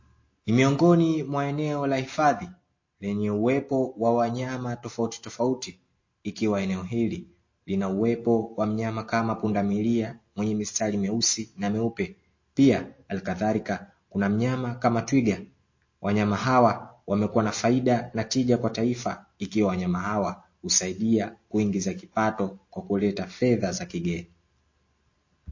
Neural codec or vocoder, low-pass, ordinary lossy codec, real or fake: none; 7.2 kHz; MP3, 32 kbps; real